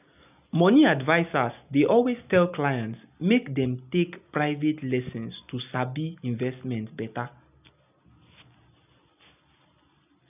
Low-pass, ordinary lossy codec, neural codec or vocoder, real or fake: 3.6 kHz; none; none; real